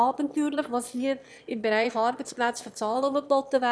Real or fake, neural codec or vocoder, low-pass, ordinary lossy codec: fake; autoencoder, 22.05 kHz, a latent of 192 numbers a frame, VITS, trained on one speaker; none; none